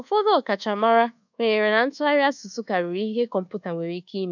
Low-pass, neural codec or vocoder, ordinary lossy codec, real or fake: 7.2 kHz; codec, 24 kHz, 1.2 kbps, DualCodec; none; fake